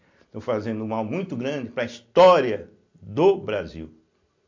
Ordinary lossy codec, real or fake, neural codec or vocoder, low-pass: MP3, 48 kbps; real; none; 7.2 kHz